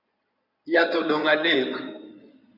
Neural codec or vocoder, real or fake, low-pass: codec, 16 kHz in and 24 kHz out, 2.2 kbps, FireRedTTS-2 codec; fake; 5.4 kHz